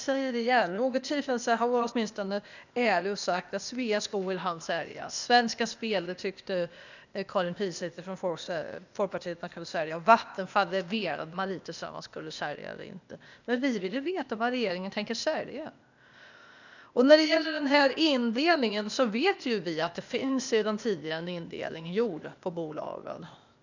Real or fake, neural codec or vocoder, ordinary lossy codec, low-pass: fake; codec, 16 kHz, 0.8 kbps, ZipCodec; none; 7.2 kHz